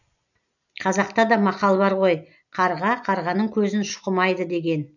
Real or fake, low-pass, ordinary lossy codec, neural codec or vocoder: real; 7.2 kHz; none; none